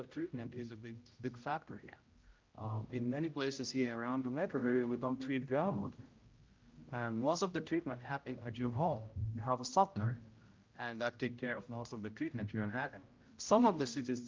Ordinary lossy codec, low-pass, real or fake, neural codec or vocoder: Opus, 24 kbps; 7.2 kHz; fake; codec, 16 kHz, 0.5 kbps, X-Codec, HuBERT features, trained on general audio